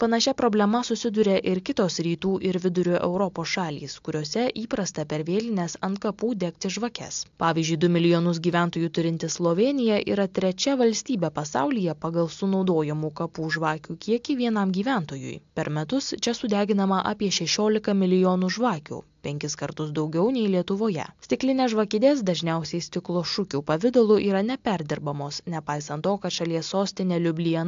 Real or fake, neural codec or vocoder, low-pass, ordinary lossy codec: real; none; 7.2 kHz; AAC, 64 kbps